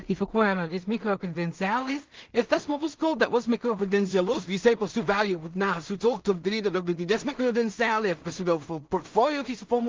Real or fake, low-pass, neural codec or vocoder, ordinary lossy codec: fake; 7.2 kHz; codec, 16 kHz in and 24 kHz out, 0.4 kbps, LongCat-Audio-Codec, two codebook decoder; Opus, 32 kbps